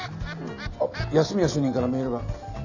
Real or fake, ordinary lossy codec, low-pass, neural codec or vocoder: real; none; 7.2 kHz; none